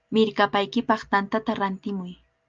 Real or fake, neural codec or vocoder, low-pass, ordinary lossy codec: real; none; 7.2 kHz; Opus, 32 kbps